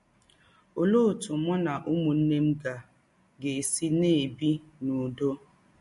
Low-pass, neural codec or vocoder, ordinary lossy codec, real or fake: 14.4 kHz; none; MP3, 48 kbps; real